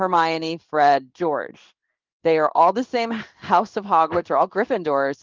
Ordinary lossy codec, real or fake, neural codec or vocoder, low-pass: Opus, 24 kbps; fake; codec, 16 kHz in and 24 kHz out, 1 kbps, XY-Tokenizer; 7.2 kHz